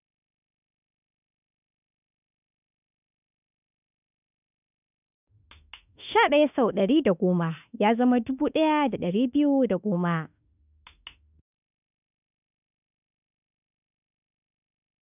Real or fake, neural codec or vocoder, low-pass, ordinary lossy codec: fake; autoencoder, 48 kHz, 32 numbers a frame, DAC-VAE, trained on Japanese speech; 3.6 kHz; none